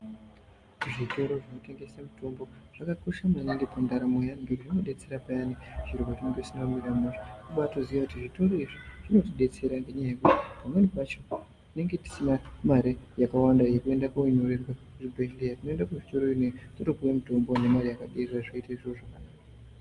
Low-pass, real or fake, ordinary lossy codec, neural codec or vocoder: 10.8 kHz; real; Opus, 32 kbps; none